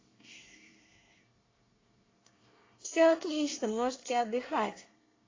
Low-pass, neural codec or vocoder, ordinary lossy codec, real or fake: 7.2 kHz; codec, 24 kHz, 1 kbps, SNAC; AAC, 32 kbps; fake